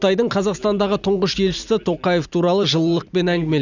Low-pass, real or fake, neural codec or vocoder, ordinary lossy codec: 7.2 kHz; fake; vocoder, 44.1 kHz, 128 mel bands every 256 samples, BigVGAN v2; none